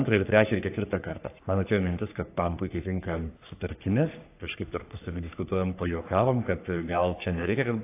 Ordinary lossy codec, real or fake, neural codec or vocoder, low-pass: AAC, 24 kbps; fake; codec, 44.1 kHz, 3.4 kbps, Pupu-Codec; 3.6 kHz